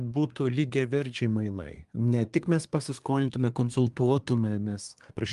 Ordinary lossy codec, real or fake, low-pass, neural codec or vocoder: Opus, 32 kbps; fake; 10.8 kHz; codec, 24 kHz, 1 kbps, SNAC